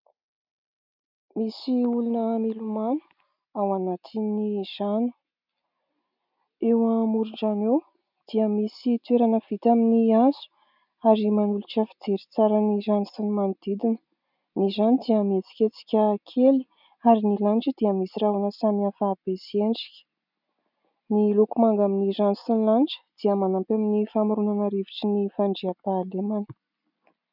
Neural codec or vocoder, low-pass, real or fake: none; 5.4 kHz; real